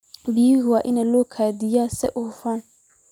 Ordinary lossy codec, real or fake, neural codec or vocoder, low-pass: none; real; none; 19.8 kHz